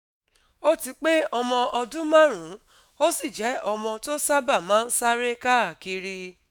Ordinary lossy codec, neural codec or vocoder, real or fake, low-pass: none; autoencoder, 48 kHz, 128 numbers a frame, DAC-VAE, trained on Japanese speech; fake; none